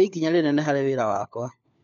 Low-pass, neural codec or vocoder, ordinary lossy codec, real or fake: 7.2 kHz; codec, 16 kHz, 4 kbps, FunCodec, trained on LibriTTS, 50 frames a second; MP3, 64 kbps; fake